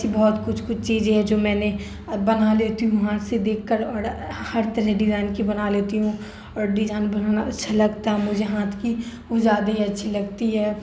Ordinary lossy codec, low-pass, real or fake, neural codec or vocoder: none; none; real; none